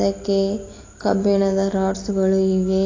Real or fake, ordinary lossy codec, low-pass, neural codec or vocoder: real; AAC, 32 kbps; 7.2 kHz; none